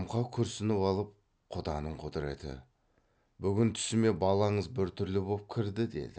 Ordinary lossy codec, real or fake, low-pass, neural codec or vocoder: none; real; none; none